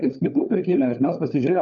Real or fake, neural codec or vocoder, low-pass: fake; codec, 16 kHz, 4 kbps, FunCodec, trained on LibriTTS, 50 frames a second; 7.2 kHz